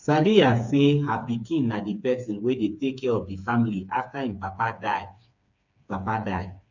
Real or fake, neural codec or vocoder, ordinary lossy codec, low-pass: fake; codec, 16 kHz, 4 kbps, FreqCodec, smaller model; none; 7.2 kHz